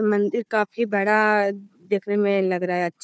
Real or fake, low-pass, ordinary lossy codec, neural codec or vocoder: fake; none; none; codec, 16 kHz, 4 kbps, FunCodec, trained on Chinese and English, 50 frames a second